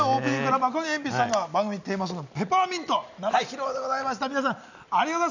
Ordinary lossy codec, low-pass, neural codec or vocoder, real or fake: none; 7.2 kHz; none; real